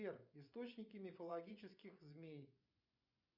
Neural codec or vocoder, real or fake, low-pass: vocoder, 24 kHz, 100 mel bands, Vocos; fake; 5.4 kHz